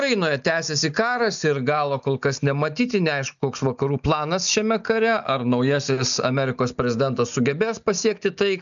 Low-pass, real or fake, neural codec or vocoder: 7.2 kHz; real; none